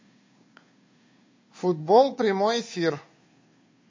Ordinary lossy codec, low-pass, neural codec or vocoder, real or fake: MP3, 32 kbps; 7.2 kHz; codec, 16 kHz, 2 kbps, FunCodec, trained on Chinese and English, 25 frames a second; fake